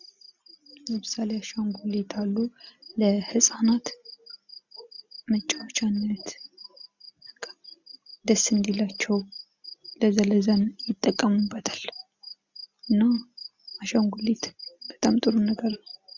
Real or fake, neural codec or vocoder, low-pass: real; none; 7.2 kHz